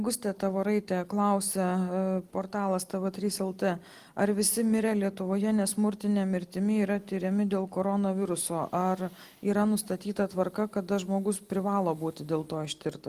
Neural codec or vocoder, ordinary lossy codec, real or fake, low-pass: none; Opus, 24 kbps; real; 14.4 kHz